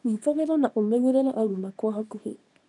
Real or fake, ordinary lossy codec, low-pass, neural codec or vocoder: fake; none; 10.8 kHz; codec, 24 kHz, 0.9 kbps, WavTokenizer, small release